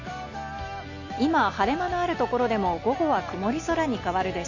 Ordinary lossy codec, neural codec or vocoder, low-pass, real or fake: none; none; 7.2 kHz; real